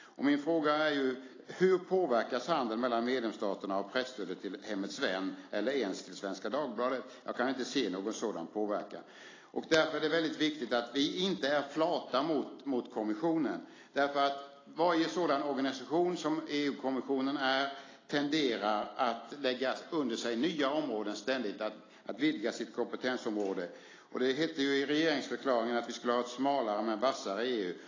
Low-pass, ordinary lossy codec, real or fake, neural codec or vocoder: 7.2 kHz; AAC, 32 kbps; real; none